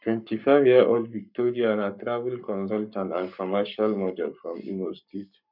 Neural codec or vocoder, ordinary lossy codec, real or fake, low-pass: codec, 44.1 kHz, 7.8 kbps, Pupu-Codec; none; fake; 5.4 kHz